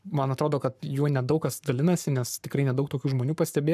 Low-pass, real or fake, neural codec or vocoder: 14.4 kHz; fake; codec, 44.1 kHz, 7.8 kbps, Pupu-Codec